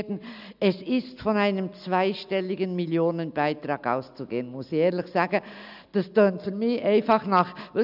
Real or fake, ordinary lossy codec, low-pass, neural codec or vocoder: real; none; 5.4 kHz; none